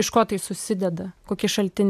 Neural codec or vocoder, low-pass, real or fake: none; 14.4 kHz; real